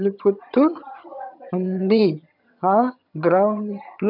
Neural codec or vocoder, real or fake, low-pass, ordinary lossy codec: vocoder, 22.05 kHz, 80 mel bands, HiFi-GAN; fake; 5.4 kHz; none